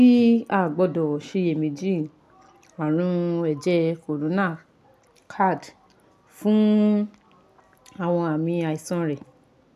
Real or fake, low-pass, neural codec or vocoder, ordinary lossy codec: real; 14.4 kHz; none; MP3, 96 kbps